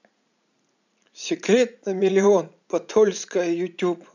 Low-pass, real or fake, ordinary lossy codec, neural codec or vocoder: 7.2 kHz; fake; none; vocoder, 44.1 kHz, 80 mel bands, Vocos